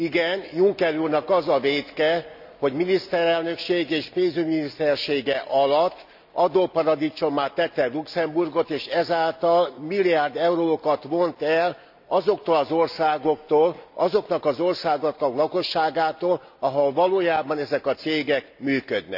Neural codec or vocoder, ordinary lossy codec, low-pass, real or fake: none; none; 5.4 kHz; real